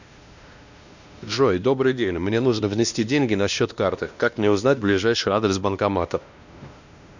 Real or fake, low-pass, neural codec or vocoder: fake; 7.2 kHz; codec, 16 kHz, 1 kbps, X-Codec, WavLM features, trained on Multilingual LibriSpeech